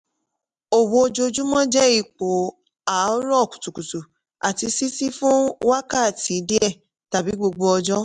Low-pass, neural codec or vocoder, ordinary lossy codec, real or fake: 10.8 kHz; none; MP3, 96 kbps; real